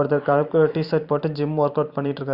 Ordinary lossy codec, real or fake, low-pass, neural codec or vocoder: Opus, 64 kbps; fake; 5.4 kHz; vocoder, 44.1 kHz, 128 mel bands every 512 samples, BigVGAN v2